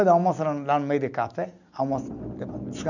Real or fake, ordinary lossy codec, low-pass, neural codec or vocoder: real; none; 7.2 kHz; none